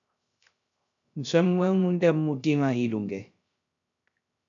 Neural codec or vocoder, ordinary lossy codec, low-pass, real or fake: codec, 16 kHz, 0.3 kbps, FocalCodec; MP3, 96 kbps; 7.2 kHz; fake